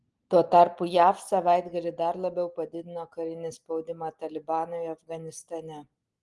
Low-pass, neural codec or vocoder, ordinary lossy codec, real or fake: 10.8 kHz; none; Opus, 24 kbps; real